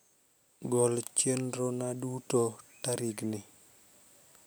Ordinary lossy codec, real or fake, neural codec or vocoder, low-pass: none; real; none; none